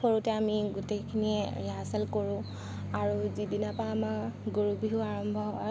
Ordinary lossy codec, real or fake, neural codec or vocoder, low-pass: none; real; none; none